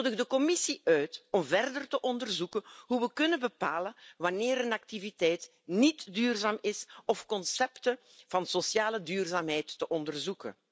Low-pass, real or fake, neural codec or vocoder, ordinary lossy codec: none; real; none; none